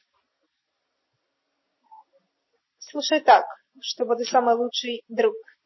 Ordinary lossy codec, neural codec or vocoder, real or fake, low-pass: MP3, 24 kbps; none; real; 7.2 kHz